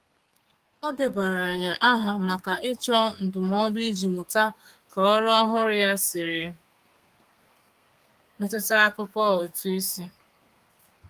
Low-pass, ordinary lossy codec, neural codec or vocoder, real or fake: 14.4 kHz; Opus, 32 kbps; codec, 32 kHz, 1.9 kbps, SNAC; fake